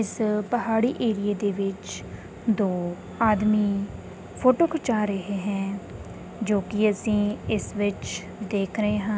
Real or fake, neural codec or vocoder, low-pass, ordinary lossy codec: real; none; none; none